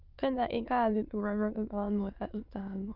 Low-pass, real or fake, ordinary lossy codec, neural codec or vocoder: 5.4 kHz; fake; Opus, 24 kbps; autoencoder, 22.05 kHz, a latent of 192 numbers a frame, VITS, trained on many speakers